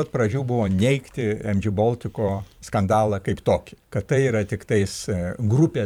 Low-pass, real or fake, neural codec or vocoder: 19.8 kHz; fake; vocoder, 44.1 kHz, 128 mel bands every 512 samples, BigVGAN v2